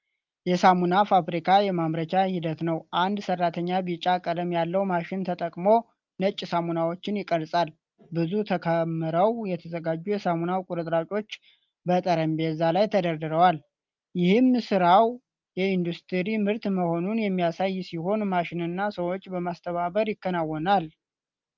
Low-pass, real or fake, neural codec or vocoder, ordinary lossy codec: 7.2 kHz; real; none; Opus, 24 kbps